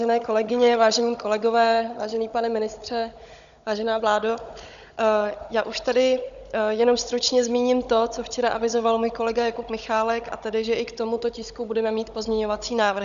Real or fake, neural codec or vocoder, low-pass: fake; codec, 16 kHz, 16 kbps, FunCodec, trained on Chinese and English, 50 frames a second; 7.2 kHz